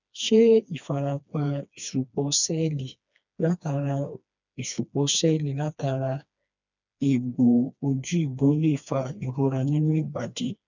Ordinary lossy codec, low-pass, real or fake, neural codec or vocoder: none; 7.2 kHz; fake; codec, 16 kHz, 2 kbps, FreqCodec, smaller model